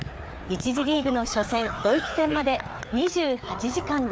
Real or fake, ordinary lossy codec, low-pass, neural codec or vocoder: fake; none; none; codec, 16 kHz, 4 kbps, FreqCodec, larger model